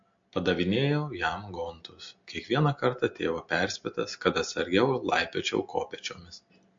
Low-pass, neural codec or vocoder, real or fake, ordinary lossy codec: 7.2 kHz; none; real; MP3, 48 kbps